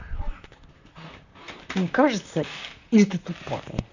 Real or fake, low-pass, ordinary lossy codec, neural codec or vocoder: fake; 7.2 kHz; none; vocoder, 44.1 kHz, 128 mel bands, Pupu-Vocoder